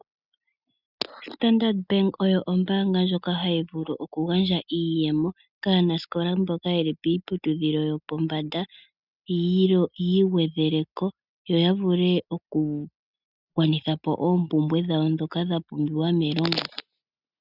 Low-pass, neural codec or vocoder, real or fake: 5.4 kHz; none; real